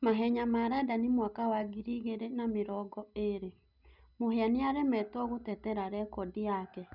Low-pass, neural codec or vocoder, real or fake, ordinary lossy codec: 5.4 kHz; none; real; none